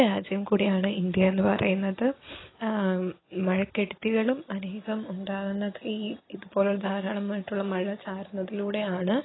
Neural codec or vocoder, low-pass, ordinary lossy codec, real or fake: none; 7.2 kHz; AAC, 16 kbps; real